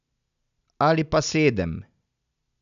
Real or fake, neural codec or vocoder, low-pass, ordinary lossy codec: real; none; 7.2 kHz; none